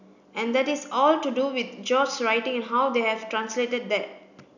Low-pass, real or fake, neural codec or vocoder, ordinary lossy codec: 7.2 kHz; real; none; none